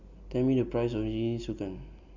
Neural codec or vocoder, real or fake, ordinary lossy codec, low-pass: none; real; none; 7.2 kHz